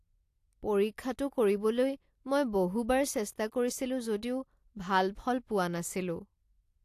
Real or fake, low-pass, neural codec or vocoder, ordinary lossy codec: real; 14.4 kHz; none; AAC, 64 kbps